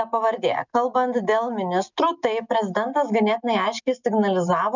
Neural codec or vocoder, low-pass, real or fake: none; 7.2 kHz; real